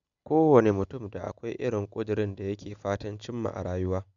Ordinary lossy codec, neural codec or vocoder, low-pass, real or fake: none; none; 7.2 kHz; real